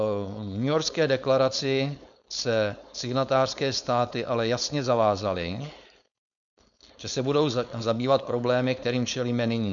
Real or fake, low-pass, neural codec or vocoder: fake; 7.2 kHz; codec, 16 kHz, 4.8 kbps, FACodec